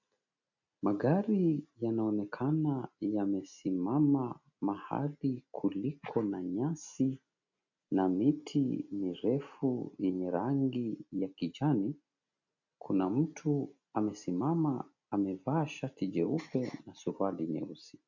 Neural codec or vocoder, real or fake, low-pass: none; real; 7.2 kHz